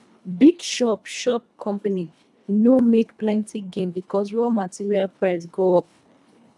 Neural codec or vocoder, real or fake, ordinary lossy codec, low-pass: codec, 24 kHz, 1.5 kbps, HILCodec; fake; none; none